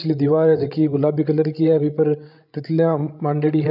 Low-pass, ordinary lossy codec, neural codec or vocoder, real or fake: 5.4 kHz; none; codec, 16 kHz, 16 kbps, FreqCodec, larger model; fake